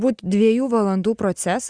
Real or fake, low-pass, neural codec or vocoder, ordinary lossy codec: real; 9.9 kHz; none; Opus, 64 kbps